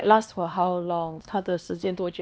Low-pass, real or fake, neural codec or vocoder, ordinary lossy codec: none; fake; codec, 16 kHz, 2 kbps, X-Codec, HuBERT features, trained on LibriSpeech; none